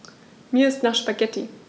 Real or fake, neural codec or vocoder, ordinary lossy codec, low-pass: real; none; none; none